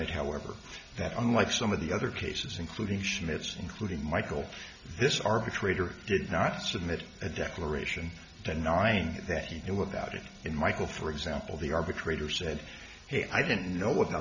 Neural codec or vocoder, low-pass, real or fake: none; 7.2 kHz; real